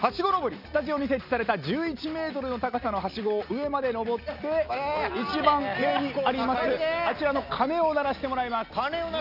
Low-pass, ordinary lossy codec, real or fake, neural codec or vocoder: 5.4 kHz; none; real; none